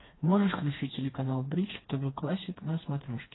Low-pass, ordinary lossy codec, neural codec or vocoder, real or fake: 7.2 kHz; AAC, 16 kbps; codec, 16 kHz, 2 kbps, FreqCodec, smaller model; fake